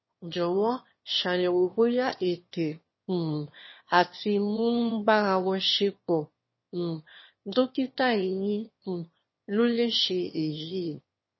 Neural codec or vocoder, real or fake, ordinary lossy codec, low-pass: autoencoder, 22.05 kHz, a latent of 192 numbers a frame, VITS, trained on one speaker; fake; MP3, 24 kbps; 7.2 kHz